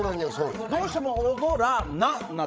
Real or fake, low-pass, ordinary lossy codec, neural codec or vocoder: fake; none; none; codec, 16 kHz, 16 kbps, FreqCodec, larger model